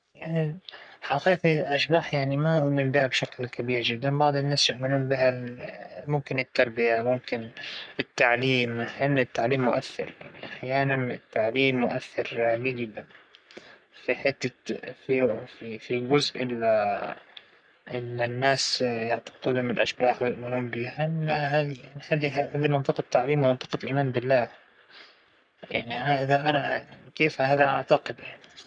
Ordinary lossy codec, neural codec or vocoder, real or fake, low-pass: none; codec, 44.1 kHz, 3.4 kbps, Pupu-Codec; fake; 9.9 kHz